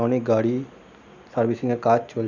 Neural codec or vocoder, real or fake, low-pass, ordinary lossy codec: none; real; 7.2 kHz; none